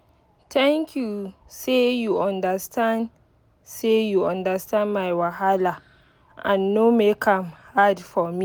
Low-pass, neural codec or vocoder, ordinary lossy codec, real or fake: none; none; none; real